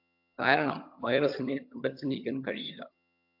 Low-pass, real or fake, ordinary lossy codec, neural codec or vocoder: 5.4 kHz; fake; AAC, 48 kbps; vocoder, 22.05 kHz, 80 mel bands, HiFi-GAN